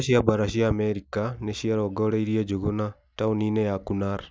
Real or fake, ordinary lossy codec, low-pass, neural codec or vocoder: real; none; none; none